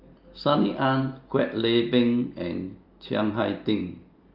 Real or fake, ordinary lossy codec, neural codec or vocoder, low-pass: real; Opus, 24 kbps; none; 5.4 kHz